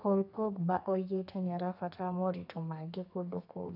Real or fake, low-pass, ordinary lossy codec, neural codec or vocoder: fake; 5.4 kHz; none; codec, 32 kHz, 1.9 kbps, SNAC